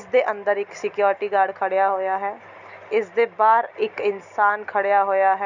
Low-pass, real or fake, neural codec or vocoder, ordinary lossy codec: 7.2 kHz; real; none; none